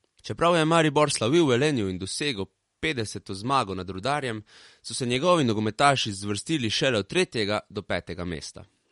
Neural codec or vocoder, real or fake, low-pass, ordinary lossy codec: none; real; 19.8 kHz; MP3, 48 kbps